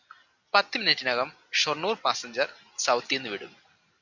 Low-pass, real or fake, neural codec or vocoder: 7.2 kHz; real; none